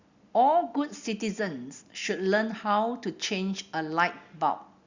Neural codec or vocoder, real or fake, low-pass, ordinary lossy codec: none; real; 7.2 kHz; Opus, 64 kbps